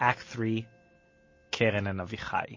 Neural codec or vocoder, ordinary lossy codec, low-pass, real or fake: none; MP3, 32 kbps; 7.2 kHz; real